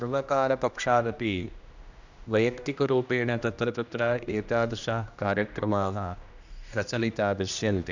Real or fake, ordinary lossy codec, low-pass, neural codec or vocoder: fake; none; 7.2 kHz; codec, 16 kHz, 1 kbps, X-Codec, HuBERT features, trained on general audio